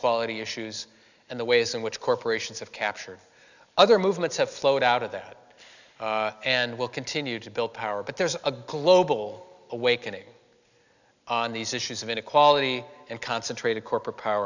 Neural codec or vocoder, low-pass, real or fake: none; 7.2 kHz; real